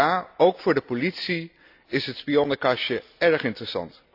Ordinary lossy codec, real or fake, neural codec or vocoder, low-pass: AAC, 48 kbps; real; none; 5.4 kHz